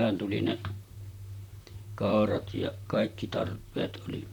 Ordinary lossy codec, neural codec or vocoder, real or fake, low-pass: none; vocoder, 44.1 kHz, 128 mel bands, Pupu-Vocoder; fake; 19.8 kHz